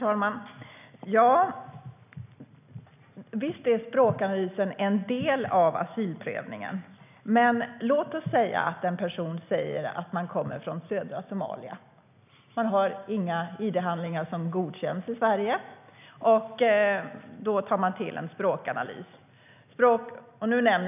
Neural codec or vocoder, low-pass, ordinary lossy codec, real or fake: none; 3.6 kHz; none; real